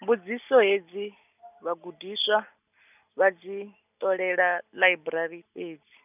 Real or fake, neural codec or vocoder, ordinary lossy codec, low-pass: real; none; none; 3.6 kHz